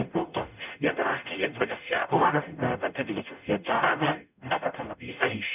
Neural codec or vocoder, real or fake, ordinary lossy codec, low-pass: codec, 44.1 kHz, 0.9 kbps, DAC; fake; none; 3.6 kHz